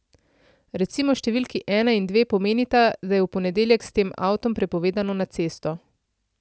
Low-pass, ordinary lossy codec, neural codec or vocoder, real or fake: none; none; none; real